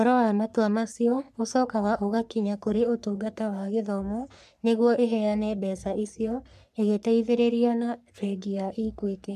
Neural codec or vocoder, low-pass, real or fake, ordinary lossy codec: codec, 44.1 kHz, 3.4 kbps, Pupu-Codec; 14.4 kHz; fake; none